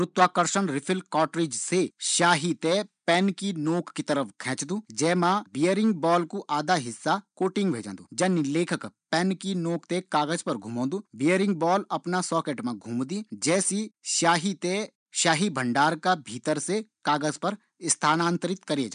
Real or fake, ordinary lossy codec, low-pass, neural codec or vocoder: real; none; 9.9 kHz; none